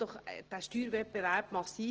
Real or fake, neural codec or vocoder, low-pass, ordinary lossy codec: real; none; 7.2 kHz; Opus, 16 kbps